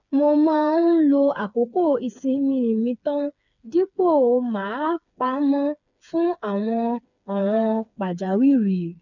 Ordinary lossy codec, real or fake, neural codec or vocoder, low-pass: none; fake; codec, 16 kHz, 4 kbps, FreqCodec, smaller model; 7.2 kHz